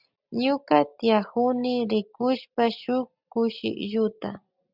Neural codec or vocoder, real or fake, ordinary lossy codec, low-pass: none; real; Opus, 64 kbps; 5.4 kHz